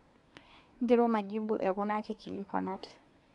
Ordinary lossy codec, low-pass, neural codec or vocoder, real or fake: none; 10.8 kHz; codec, 24 kHz, 1 kbps, SNAC; fake